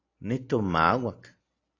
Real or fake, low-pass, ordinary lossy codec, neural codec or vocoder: real; 7.2 kHz; AAC, 32 kbps; none